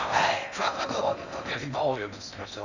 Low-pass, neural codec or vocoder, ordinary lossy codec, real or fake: 7.2 kHz; codec, 16 kHz in and 24 kHz out, 0.6 kbps, FocalCodec, streaming, 4096 codes; none; fake